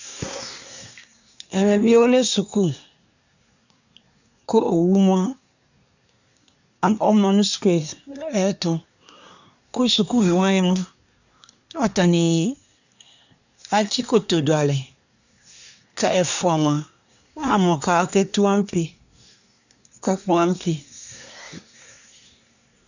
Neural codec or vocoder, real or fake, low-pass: codec, 24 kHz, 1 kbps, SNAC; fake; 7.2 kHz